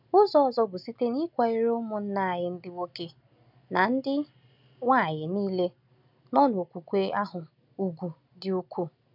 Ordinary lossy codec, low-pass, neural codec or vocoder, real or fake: none; 5.4 kHz; none; real